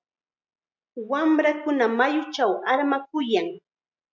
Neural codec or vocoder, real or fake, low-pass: none; real; 7.2 kHz